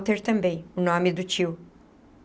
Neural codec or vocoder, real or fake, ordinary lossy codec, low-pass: none; real; none; none